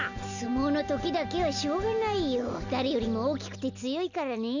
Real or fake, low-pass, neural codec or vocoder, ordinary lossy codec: real; 7.2 kHz; none; none